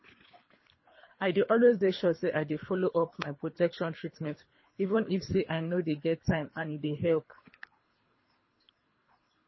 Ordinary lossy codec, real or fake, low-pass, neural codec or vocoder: MP3, 24 kbps; fake; 7.2 kHz; codec, 24 kHz, 3 kbps, HILCodec